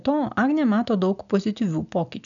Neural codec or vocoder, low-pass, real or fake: none; 7.2 kHz; real